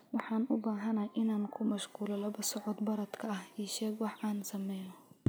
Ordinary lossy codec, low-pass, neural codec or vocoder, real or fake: none; none; none; real